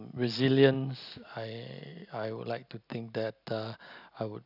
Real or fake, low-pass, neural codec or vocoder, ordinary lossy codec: real; 5.4 kHz; none; none